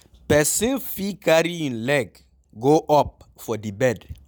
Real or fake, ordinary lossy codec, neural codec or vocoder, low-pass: real; none; none; none